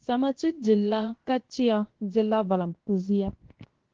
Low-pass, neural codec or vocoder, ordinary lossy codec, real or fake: 7.2 kHz; codec, 16 kHz, 0.7 kbps, FocalCodec; Opus, 16 kbps; fake